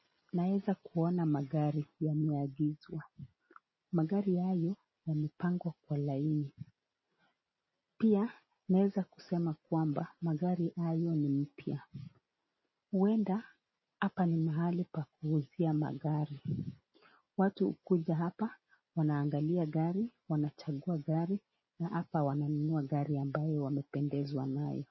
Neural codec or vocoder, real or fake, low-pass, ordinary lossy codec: none; real; 7.2 kHz; MP3, 24 kbps